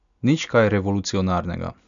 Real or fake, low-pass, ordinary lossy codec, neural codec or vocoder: real; 7.2 kHz; none; none